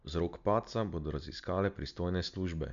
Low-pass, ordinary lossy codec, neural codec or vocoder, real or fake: 7.2 kHz; none; none; real